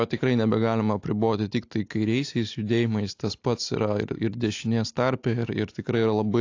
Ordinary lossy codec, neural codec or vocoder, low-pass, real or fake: AAC, 48 kbps; none; 7.2 kHz; real